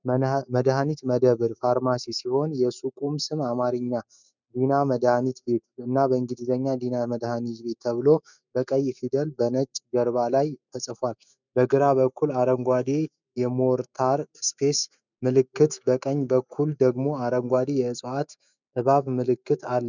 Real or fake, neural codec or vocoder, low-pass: fake; autoencoder, 48 kHz, 128 numbers a frame, DAC-VAE, trained on Japanese speech; 7.2 kHz